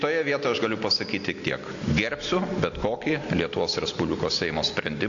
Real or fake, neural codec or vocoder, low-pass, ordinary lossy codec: real; none; 7.2 kHz; AAC, 48 kbps